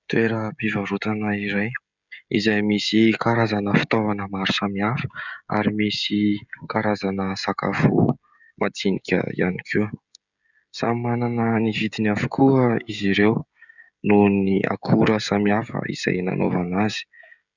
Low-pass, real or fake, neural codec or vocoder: 7.2 kHz; fake; codec, 16 kHz, 16 kbps, FreqCodec, smaller model